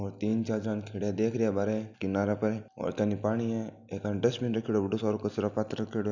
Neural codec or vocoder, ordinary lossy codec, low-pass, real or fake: none; none; 7.2 kHz; real